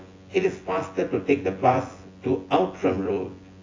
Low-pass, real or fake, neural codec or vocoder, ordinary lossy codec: 7.2 kHz; fake; vocoder, 24 kHz, 100 mel bands, Vocos; AAC, 32 kbps